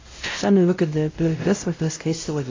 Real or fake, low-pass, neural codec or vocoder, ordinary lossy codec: fake; 7.2 kHz; codec, 16 kHz, 0.5 kbps, X-Codec, WavLM features, trained on Multilingual LibriSpeech; AAC, 32 kbps